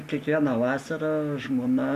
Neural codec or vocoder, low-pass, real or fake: none; 14.4 kHz; real